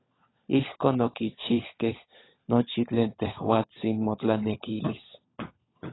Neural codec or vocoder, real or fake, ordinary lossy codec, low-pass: codec, 16 kHz, 16 kbps, FunCodec, trained on LibriTTS, 50 frames a second; fake; AAC, 16 kbps; 7.2 kHz